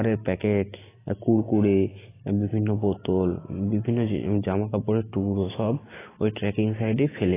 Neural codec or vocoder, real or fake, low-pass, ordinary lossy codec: none; real; 3.6 kHz; AAC, 16 kbps